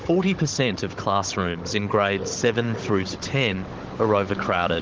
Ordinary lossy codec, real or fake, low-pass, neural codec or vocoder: Opus, 16 kbps; fake; 7.2 kHz; codec, 16 kHz, 16 kbps, FunCodec, trained on Chinese and English, 50 frames a second